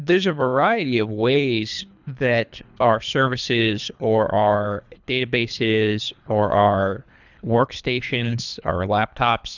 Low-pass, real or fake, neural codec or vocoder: 7.2 kHz; fake; codec, 24 kHz, 3 kbps, HILCodec